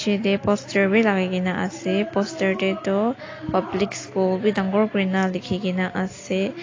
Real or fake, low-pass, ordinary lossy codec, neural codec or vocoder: real; 7.2 kHz; AAC, 32 kbps; none